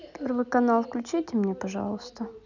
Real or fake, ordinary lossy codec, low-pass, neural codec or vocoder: real; none; 7.2 kHz; none